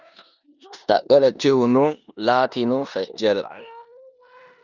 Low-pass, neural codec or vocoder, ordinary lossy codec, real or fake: 7.2 kHz; codec, 16 kHz in and 24 kHz out, 0.9 kbps, LongCat-Audio-Codec, four codebook decoder; Opus, 64 kbps; fake